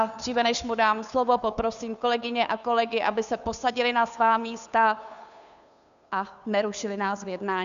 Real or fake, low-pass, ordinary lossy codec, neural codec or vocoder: fake; 7.2 kHz; MP3, 96 kbps; codec, 16 kHz, 2 kbps, FunCodec, trained on Chinese and English, 25 frames a second